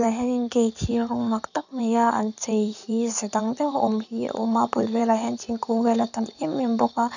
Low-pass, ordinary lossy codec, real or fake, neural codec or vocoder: 7.2 kHz; none; fake; codec, 16 kHz in and 24 kHz out, 2.2 kbps, FireRedTTS-2 codec